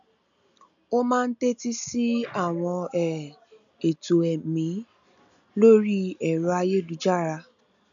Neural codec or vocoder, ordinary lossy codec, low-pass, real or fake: none; none; 7.2 kHz; real